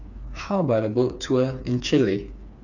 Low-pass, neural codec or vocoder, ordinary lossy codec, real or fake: 7.2 kHz; codec, 16 kHz, 4 kbps, FreqCodec, smaller model; none; fake